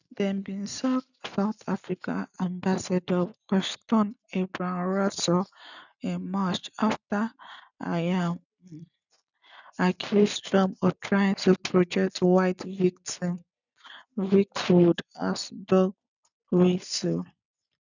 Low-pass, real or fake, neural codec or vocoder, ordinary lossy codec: 7.2 kHz; real; none; none